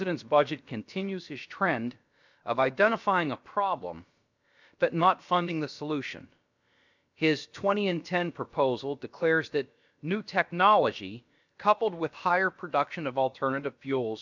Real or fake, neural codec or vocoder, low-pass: fake; codec, 16 kHz, about 1 kbps, DyCAST, with the encoder's durations; 7.2 kHz